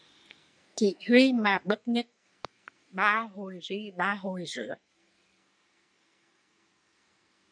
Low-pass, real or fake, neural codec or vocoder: 9.9 kHz; fake; codec, 44.1 kHz, 2.6 kbps, SNAC